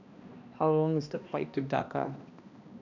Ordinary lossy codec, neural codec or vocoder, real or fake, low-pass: none; codec, 16 kHz, 1 kbps, X-Codec, HuBERT features, trained on balanced general audio; fake; 7.2 kHz